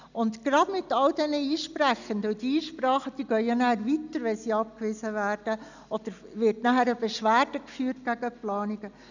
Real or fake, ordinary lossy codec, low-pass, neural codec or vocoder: real; none; 7.2 kHz; none